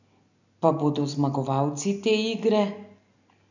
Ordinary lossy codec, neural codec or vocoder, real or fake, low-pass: none; none; real; 7.2 kHz